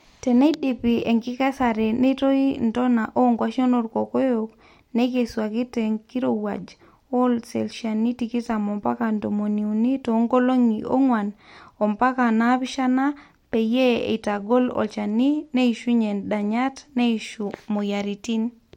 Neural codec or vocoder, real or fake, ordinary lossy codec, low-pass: none; real; MP3, 64 kbps; 19.8 kHz